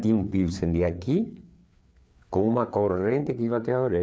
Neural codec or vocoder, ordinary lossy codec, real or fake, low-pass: codec, 16 kHz, 4 kbps, FreqCodec, larger model; none; fake; none